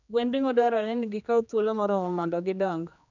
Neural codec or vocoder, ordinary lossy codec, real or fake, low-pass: codec, 16 kHz, 2 kbps, X-Codec, HuBERT features, trained on general audio; none; fake; 7.2 kHz